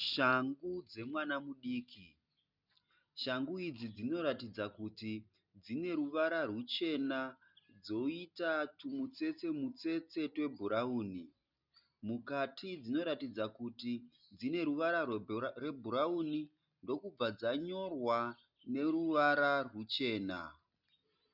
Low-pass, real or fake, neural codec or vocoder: 5.4 kHz; real; none